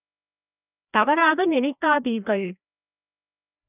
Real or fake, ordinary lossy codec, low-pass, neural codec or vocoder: fake; none; 3.6 kHz; codec, 16 kHz, 1 kbps, FreqCodec, larger model